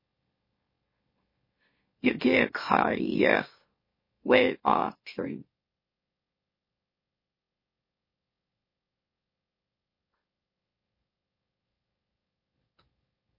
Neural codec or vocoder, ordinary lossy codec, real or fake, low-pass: autoencoder, 44.1 kHz, a latent of 192 numbers a frame, MeloTTS; MP3, 24 kbps; fake; 5.4 kHz